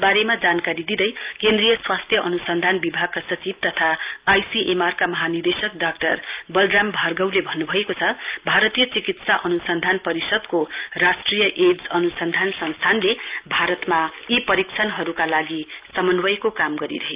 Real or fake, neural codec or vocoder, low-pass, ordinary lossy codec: real; none; 3.6 kHz; Opus, 32 kbps